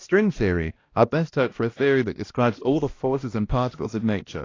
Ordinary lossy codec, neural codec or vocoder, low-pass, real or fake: AAC, 32 kbps; codec, 16 kHz, 1 kbps, X-Codec, HuBERT features, trained on balanced general audio; 7.2 kHz; fake